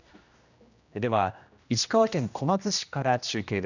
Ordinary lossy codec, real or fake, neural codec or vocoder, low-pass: none; fake; codec, 16 kHz, 1 kbps, X-Codec, HuBERT features, trained on general audio; 7.2 kHz